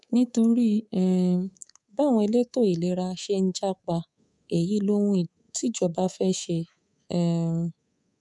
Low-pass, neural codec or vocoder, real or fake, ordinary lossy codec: 10.8 kHz; codec, 24 kHz, 3.1 kbps, DualCodec; fake; none